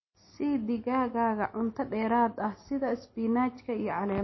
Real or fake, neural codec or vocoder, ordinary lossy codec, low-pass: real; none; MP3, 24 kbps; 7.2 kHz